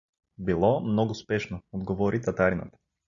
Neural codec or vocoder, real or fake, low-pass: none; real; 7.2 kHz